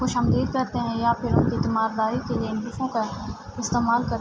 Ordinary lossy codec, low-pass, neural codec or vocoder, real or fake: none; none; none; real